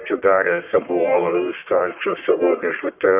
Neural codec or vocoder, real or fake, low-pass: codec, 44.1 kHz, 1.7 kbps, Pupu-Codec; fake; 3.6 kHz